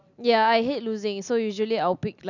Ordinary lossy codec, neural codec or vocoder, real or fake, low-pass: none; none; real; 7.2 kHz